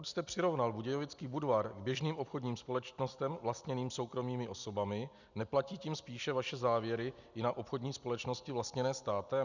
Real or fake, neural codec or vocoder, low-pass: real; none; 7.2 kHz